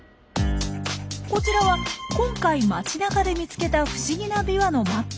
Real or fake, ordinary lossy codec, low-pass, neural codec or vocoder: real; none; none; none